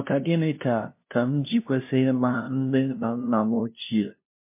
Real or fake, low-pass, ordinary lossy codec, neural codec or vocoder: fake; 3.6 kHz; MP3, 24 kbps; codec, 16 kHz, 0.5 kbps, FunCodec, trained on LibriTTS, 25 frames a second